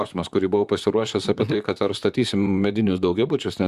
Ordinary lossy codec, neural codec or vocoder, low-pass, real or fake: Opus, 64 kbps; vocoder, 44.1 kHz, 128 mel bands, Pupu-Vocoder; 14.4 kHz; fake